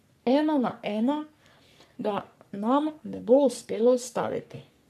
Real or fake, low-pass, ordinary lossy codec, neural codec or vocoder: fake; 14.4 kHz; none; codec, 44.1 kHz, 3.4 kbps, Pupu-Codec